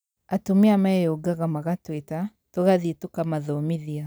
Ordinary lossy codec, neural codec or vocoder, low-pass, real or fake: none; none; none; real